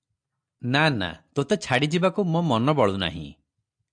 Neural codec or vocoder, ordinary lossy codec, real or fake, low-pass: none; AAC, 64 kbps; real; 9.9 kHz